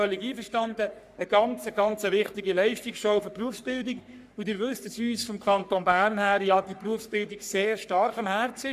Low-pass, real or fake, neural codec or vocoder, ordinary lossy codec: 14.4 kHz; fake; codec, 44.1 kHz, 3.4 kbps, Pupu-Codec; none